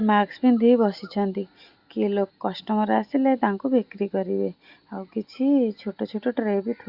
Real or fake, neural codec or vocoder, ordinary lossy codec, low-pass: real; none; Opus, 64 kbps; 5.4 kHz